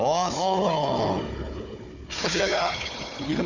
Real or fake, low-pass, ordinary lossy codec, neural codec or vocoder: fake; 7.2 kHz; none; codec, 16 kHz, 4 kbps, FunCodec, trained on Chinese and English, 50 frames a second